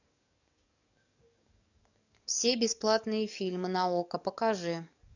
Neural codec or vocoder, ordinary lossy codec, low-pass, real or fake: codec, 44.1 kHz, 7.8 kbps, DAC; none; 7.2 kHz; fake